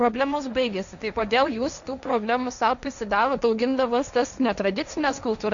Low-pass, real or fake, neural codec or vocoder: 7.2 kHz; fake; codec, 16 kHz, 1.1 kbps, Voila-Tokenizer